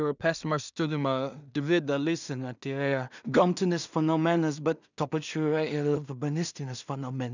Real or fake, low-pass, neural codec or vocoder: fake; 7.2 kHz; codec, 16 kHz in and 24 kHz out, 0.4 kbps, LongCat-Audio-Codec, two codebook decoder